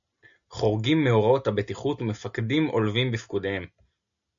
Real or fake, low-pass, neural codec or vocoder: real; 7.2 kHz; none